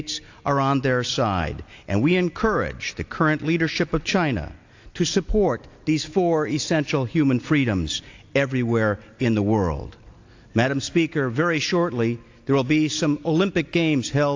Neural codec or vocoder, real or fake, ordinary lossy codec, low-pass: none; real; AAC, 48 kbps; 7.2 kHz